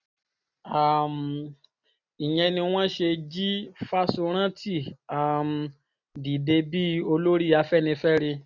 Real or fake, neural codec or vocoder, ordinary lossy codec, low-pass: real; none; none; 7.2 kHz